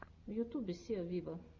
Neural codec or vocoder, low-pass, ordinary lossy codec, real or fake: none; 7.2 kHz; Opus, 64 kbps; real